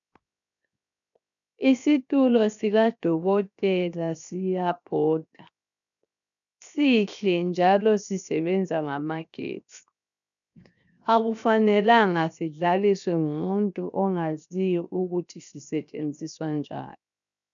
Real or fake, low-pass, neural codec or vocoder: fake; 7.2 kHz; codec, 16 kHz, 0.7 kbps, FocalCodec